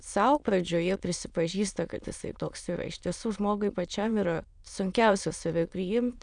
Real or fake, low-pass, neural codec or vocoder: fake; 9.9 kHz; autoencoder, 22.05 kHz, a latent of 192 numbers a frame, VITS, trained on many speakers